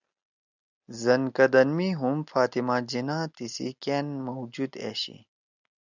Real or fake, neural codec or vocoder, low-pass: real; none; 7.2 kHz